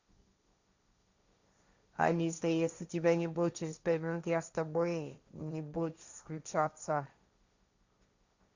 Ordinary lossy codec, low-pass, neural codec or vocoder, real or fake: Opus, 64 kbps; 7.2 kHz; codec, 16 kHz, 1.1 kbps, Voila-Tokenizer; fake